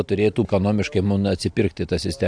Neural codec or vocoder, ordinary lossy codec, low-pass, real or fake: none; AAC, 64 kbps; 9.9 kHz; real